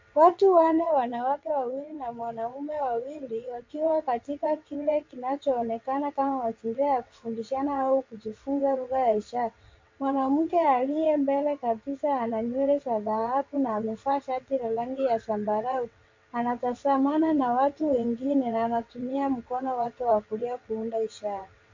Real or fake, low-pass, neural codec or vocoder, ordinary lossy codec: fake; 7.2 kHz; vocoder, 22.05 kHz, 80 mel bands, WaveNeXt; MP3, 48 kbps